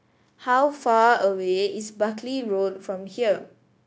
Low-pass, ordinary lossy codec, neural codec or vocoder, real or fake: none; none; codec, 16 kHz, 0.9 kbps, LongCat-Audio-Codec; fake